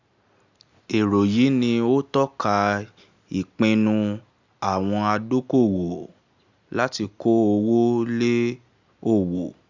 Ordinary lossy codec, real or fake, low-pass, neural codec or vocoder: Opus, 64 kbps; real; 7.2 kHz; none